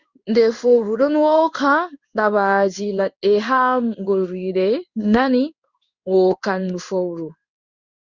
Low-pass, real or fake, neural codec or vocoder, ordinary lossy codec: 7.2 kHz; fake; codec, 16 kHz in and 24 kHz out, 1 kbps, XY-Tokenizer; Opus, 64 kbps